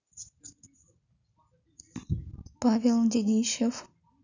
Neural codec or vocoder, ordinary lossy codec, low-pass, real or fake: none; none; 7.2 kHz; real